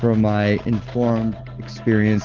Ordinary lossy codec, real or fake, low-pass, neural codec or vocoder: Opus, 16 kbps; real; 7.2 kHz; none